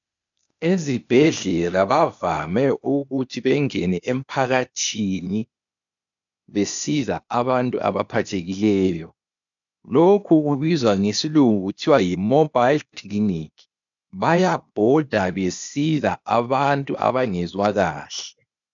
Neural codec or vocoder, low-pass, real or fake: codec, 16 kHz, 0.8 kbps, ZipCodec; 7.2 kHz; fake